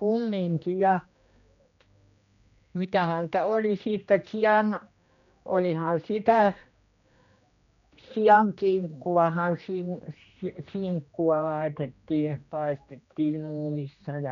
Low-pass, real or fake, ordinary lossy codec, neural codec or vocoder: 7.2 kHz; fake; none; codec, 16 kHz, 1 kbps, X-Codec, HuBERT features, trained on general audio